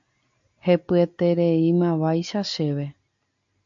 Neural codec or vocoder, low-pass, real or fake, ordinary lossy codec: none; 7.2 kHz; real; AAC, 64 kbps